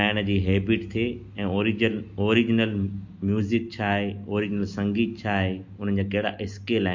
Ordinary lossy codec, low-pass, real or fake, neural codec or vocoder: MP3, 48 kbps; 7.2 kHz; real; none